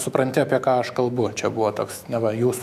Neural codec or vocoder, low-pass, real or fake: autoencoder, 48 kHz, 128 numbers a frame, DAC-VAE, trained on Japanese speech; 14.4 kHz; fake